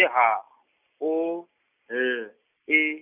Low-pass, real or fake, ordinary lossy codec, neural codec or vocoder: 3.6 kHz; real; none; none